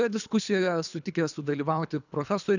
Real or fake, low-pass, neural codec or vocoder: fake; 7.2 kHz; codec, 24 kHz, 3 kbps, HILCodec